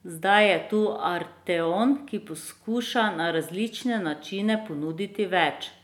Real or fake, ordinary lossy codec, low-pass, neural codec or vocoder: real; none; 19.8 kHz; none